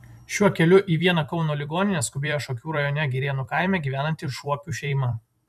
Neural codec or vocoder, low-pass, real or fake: vocoder, 44.1 kHz, 128 mel bands every 256 samples, BigVGAN v2; 14.4 kHz; fake